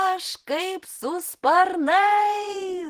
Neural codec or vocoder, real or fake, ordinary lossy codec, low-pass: vocoder, 48 kHz, 128 mel bands, Vocos; fake; Opus, 16 kbps; 14.4 kHz